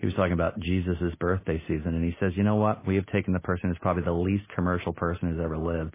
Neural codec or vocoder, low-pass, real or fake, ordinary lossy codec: none; 3.6 kHz; real; MP3, 16 kbps